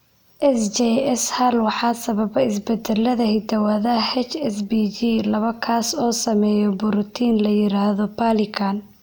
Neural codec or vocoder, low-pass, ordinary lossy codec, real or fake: none; none; none; real